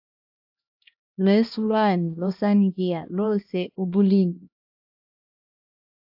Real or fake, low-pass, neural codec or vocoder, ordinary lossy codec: fake; 5.4 kHz; codec, 16 kHz, 1 kbps, X-Codec, HuBERT features, trained on LibriSpeech; AAC, 48 kbps